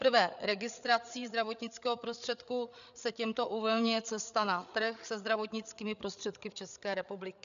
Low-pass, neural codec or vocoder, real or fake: 7.2 kHz; codec, 16 kHz, 8 kbps, FreqCodec, larger model; fake